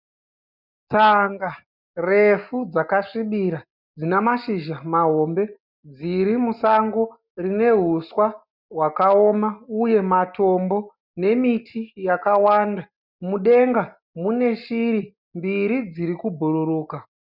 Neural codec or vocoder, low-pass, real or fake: none; 5.4 kHz; real